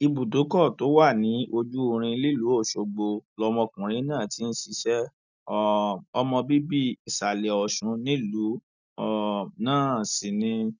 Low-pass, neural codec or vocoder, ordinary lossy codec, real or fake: 7.2 kHz; none; none; real